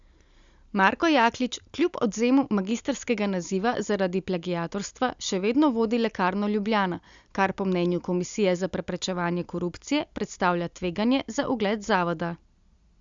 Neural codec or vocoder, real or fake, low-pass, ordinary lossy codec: none; real; 7.2 kHz; Opus, 64 kbps